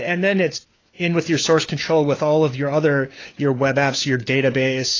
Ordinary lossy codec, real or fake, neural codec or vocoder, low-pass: AAC, 32 kbps; fake; codec, 16 kHz, 4 kbps, FunCodec, trained on LibriTTS, 50 frames a second; 7.2 kHz